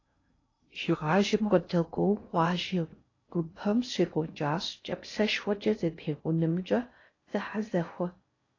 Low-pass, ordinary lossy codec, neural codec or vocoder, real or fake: 7.2 kHz; AAC, 32 kbps; codec, 16 kHz in and 24 kHz out, 0.6 kbps, FocalCodec, streaming, 2048 codes; fake